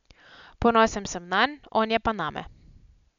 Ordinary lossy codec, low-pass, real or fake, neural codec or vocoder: none; 7.2 kHz; real; none